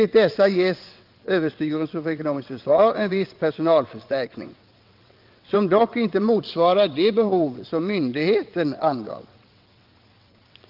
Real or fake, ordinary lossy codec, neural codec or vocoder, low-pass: fake; Opus, 24 kbps; vocoder, 22.05 kHz, 80 mel bands, WaveNeXt; 5.4 kHz